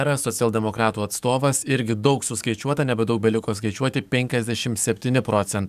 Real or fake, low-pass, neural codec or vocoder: fake; 14.4 kHz; codec, 44.1 kHz, 7.8 kbps, DAC